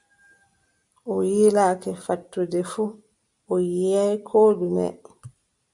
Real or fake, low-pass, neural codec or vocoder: real; 10.8 kHz; none